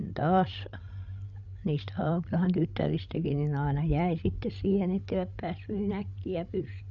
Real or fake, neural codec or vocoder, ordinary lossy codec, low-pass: fake; codec, 16 kHz, 16 kbps, FreqCodec, larger model; none; 7.2 kHz